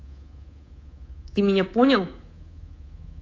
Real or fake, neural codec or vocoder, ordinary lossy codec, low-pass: fake; codec, 24 kHz, 3.1 kbps, DualCodec; AAC, 32 kbps; 7.2 kHz